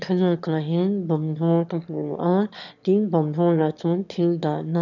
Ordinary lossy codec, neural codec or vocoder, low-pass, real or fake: none; autoencoder, 22.05 kHz, a latent of 192 numbers a frame, VITS, trained on one speaker; 7.2 kHz; fake